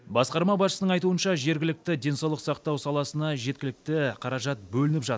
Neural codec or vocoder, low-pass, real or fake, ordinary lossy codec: none; none; real; none